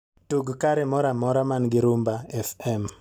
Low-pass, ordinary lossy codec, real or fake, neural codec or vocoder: none; none; real; none